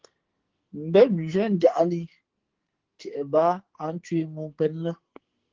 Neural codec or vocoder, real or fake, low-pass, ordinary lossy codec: codec, 44.1 kHz, 2.6 kbps, SNAC; fake; 7.2 kHz; Opus, 16 kbps